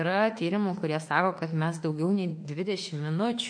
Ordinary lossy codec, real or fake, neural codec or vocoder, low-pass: MP3, 48 kbps; fake; autoencoder, 48 kHz, 32 numbers a frame, DAC-VAE, trained on Japanese speech; 9.9 kHz